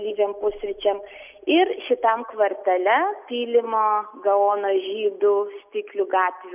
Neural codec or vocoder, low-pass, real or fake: none; 3.6 kHz; real